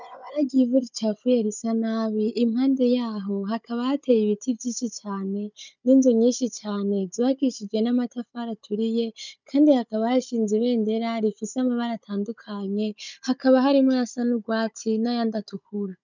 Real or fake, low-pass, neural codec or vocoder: fake; 7.2 kHz; codec, 16 kHz, 16 kbps, FunCodec, trained on Chinese and English, 50 frames a second